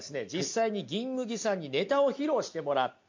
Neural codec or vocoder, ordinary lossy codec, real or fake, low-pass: none; MP3, 48 kbps; real; 7.2 kHz